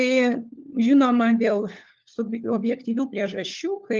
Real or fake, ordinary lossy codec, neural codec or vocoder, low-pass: fake; Opus, 24 kbps; codec, 16 kHz, 4 kbps, FunCodec, trained on LibriTTS, 50 frames a second; 7.2 kHz